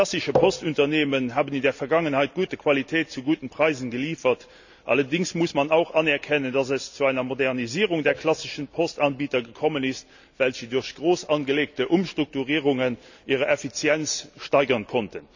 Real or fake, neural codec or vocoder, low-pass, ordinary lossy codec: real; none; 7.2 kHz; none